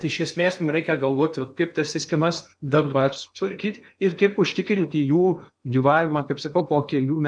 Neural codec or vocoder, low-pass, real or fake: codec, 16 kHz in and 24 kHz out, 0.8 kbps, FocalCodec, streaming, 65536 codes; 9.9 kHz; fake